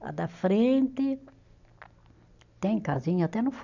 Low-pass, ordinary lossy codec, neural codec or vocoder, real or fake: 7.2 kHz; none; none; real